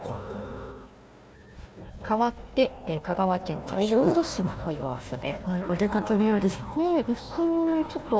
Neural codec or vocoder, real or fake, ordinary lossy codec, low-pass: codec, 16 kHz, 1 kbps, FunCodec, trained on Chinese and English, 50 frames a second; fake; none; none